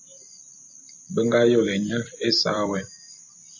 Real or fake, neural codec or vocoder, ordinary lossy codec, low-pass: fake; vocoder, 44.1 kHz, 128 mel bands every 256 samples, BigVGAN v2; AAC, 48 kbps; 7.2 kHz